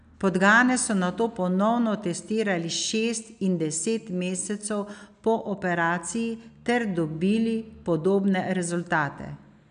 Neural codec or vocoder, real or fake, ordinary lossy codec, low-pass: none; real; none; 9.9 kHz